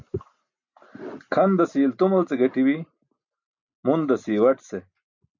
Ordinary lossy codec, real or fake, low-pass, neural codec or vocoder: MP3, 64 kbps; real; 7.2 kHz; none